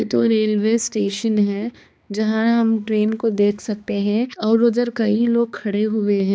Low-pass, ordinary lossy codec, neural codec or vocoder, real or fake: none; none; codec, 16 kHz, 2 kbps, X-Codec, HuBERT features, trained on balanced general audio; fake